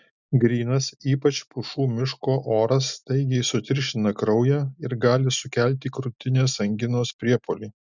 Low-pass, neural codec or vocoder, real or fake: 7.2 kHz; none; real